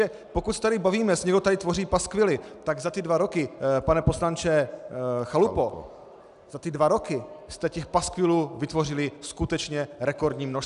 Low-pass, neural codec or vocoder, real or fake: 10.8 kHz; none; real